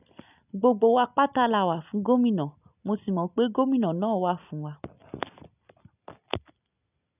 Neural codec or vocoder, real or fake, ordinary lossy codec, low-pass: none; real; none; 3.6 kHz